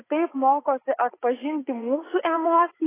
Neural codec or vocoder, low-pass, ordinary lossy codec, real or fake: vocoder, 44.1 kHz, 80 mel bands, Vocos; 3.6 kHz; AAC, 16 kbps; fake